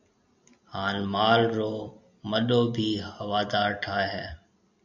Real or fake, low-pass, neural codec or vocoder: real; 7.2 kHz; none